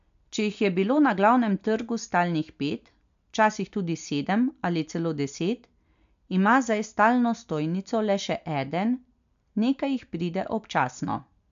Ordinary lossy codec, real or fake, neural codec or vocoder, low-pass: MP3, 64 kbps; real; none; 7.2 kHz